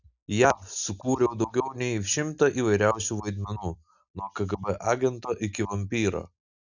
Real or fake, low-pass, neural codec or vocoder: real; 7.2 kHz; none